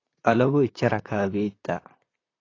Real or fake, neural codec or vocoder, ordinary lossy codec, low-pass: fake; vocoder, 44.1 kHz, 128 mel bands, Pupu-Vocoder; AAC, 32 kbps; 7.2 kHz